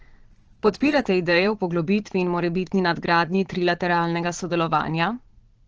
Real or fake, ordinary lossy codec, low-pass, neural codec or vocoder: fake; Opus, 16 kbps; 7.2 kHz; vocoder, 22.05 kHz, 80 mel bands, Vocos